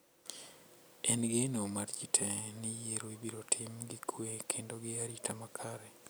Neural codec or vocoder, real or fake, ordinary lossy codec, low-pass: none; real; none; none